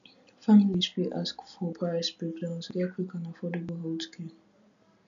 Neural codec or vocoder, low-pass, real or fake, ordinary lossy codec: none; 7.2 kHz; real; none